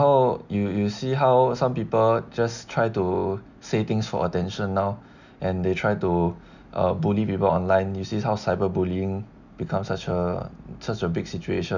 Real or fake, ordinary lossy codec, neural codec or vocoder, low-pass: real; none; none; 7.2 kHz